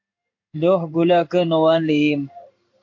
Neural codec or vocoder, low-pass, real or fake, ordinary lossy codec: codec, 16 kHz in and 24 kHz out, 1 kbps, XY-Tokenizer; 7.2 kHz; fake; AAC, 48 kbps